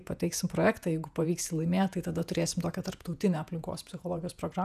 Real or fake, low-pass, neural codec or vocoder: fake; 14.4 kHz; vocoder, 48 kHz, 128 mel bands, Vocos